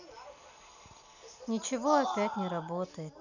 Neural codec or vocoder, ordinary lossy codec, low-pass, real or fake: none; none; 7.2 kHz; real